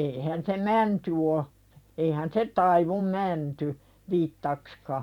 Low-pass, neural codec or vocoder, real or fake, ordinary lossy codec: 19.8 kHz; vocoder, 44.1 kHz, 128 mel bands every 512 samples, BigVGAN v2; fake; none